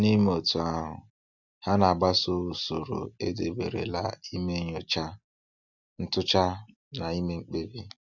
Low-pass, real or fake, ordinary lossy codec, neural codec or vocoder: 7.2 kHz; real; none; none